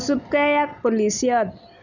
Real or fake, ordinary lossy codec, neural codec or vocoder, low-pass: real; none; none; 7.2 kHz